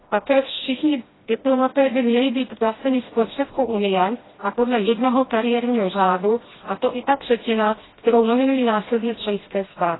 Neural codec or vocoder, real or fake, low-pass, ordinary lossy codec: codec, 16 kHz, 1 kbps, FreqCodec, smaller model; fake; 7.2 kHz; AAC, 16 kbps